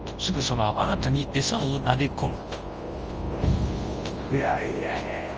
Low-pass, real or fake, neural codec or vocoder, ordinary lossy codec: 7.2 kHz; fake; codec, 24 kHz, 0.9 kbps, WavTokenizer, large speech release; Opus, 24 kbps